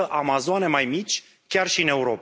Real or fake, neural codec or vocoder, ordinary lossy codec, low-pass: real; none; none; none